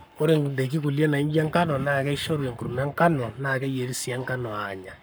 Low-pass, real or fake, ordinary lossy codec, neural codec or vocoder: none; fake; none; codec, 44.1 kHz, 7.8 kbps, Pupu-Codec